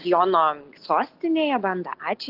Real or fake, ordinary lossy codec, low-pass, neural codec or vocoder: real; Opus, 16 kbps; 5.4 kHz; none